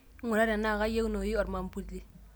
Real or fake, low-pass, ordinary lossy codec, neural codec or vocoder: real; none; none; none